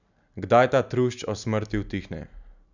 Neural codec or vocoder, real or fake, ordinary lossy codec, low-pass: none; real; none; 7.2 kHz